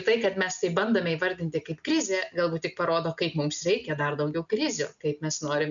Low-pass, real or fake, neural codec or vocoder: 7.2 kHz; real; none